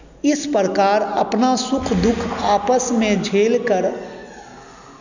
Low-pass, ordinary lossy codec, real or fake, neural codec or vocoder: 7.2 kHz; none; real; none